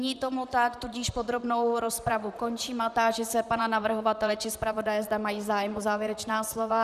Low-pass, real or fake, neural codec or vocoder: 14.4 kHz; fake; vocoder, 44.1 kHz, 128 mel bands, Pupu-Vocoder